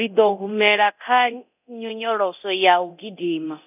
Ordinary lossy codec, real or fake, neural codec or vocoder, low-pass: none; fake; codec, 24 kHz, 0.9 kbps, DualCodec; 3.6 kHz